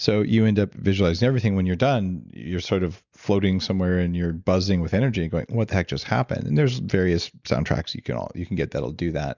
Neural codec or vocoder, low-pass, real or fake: none; 7.2 kHz; real